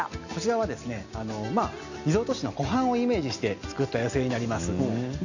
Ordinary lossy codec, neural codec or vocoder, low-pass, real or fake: none; none; 7.2 kHz; real